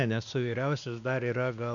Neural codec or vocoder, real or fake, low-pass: codec, 16 kHz, 2 kbps, X-Codec, WavLM features, trained on Multilingual LibriSpeech; fake; 7.2 kHz